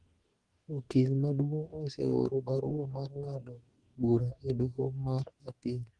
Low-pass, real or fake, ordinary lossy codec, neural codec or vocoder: 10.8 kHz; fake; Opus, 24 kbps; codec, 44.1 kHz, 2.6 kbps, DAC